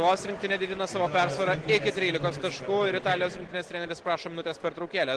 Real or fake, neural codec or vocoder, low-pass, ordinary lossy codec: real; none; 10.8 kHz; Opus, 16 kbps